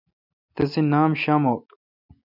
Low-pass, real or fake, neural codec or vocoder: 5.4 kHz; real; none